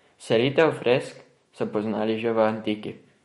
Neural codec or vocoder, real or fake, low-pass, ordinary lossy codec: autoencoder, 48 kHz, 128 numbers a frame, DAC-VAE, trained on Japanese speech; fake; 19.8 kHz; MP3, 48 kbps